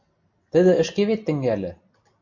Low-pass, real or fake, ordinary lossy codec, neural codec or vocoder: 7.2 kHz; real; MP3, 48 kbps; none